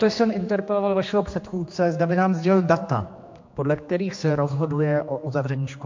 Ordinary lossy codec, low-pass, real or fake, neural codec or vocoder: MP3, 48 kbps; 7.2 kHz; fake; codec, 16 kHz, 2 kbps, X-Codec, HuBERT features, trained on general audio